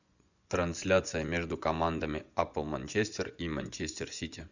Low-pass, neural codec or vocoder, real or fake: 7.2 kHz; vocoder, 24 kHz, 100 mel bands, Vocos; fake